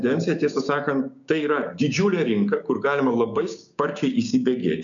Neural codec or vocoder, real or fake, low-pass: codec, 16 kHz, 6 kbps, DAC; fake; 7.2 kHz